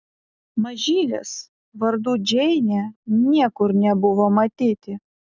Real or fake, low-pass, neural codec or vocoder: real; 7.2 kHz; none